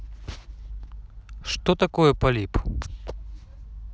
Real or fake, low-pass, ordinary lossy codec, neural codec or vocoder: real; none; none; none